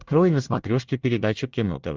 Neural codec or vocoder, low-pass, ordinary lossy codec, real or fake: codec, 24 kHz, 1 kbps, SNAC; 7.2 kHz; Opus, 32 kbps; fake